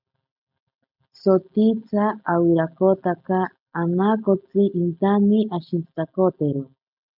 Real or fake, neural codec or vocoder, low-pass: real; none; 5.4 kHz